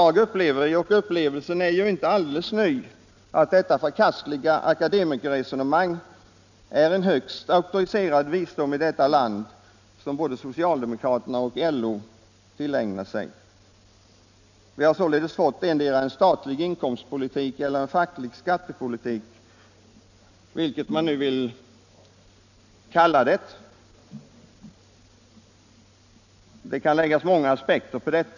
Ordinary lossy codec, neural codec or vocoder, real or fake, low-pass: none; none; real; 7.2 kHz